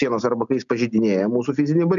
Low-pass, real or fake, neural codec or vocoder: 7.2 kHz; real; none